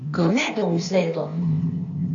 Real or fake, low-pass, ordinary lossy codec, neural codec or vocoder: fake; 7.2 kHz; AAC, 48 kbps; codec, 16 kHz, 0.8 kbps, ZipCodec